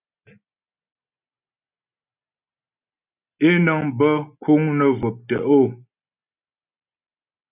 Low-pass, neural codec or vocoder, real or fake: 3.6 kHz; none; real